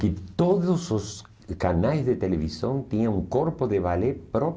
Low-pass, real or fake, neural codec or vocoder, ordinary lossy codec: none; real; none; none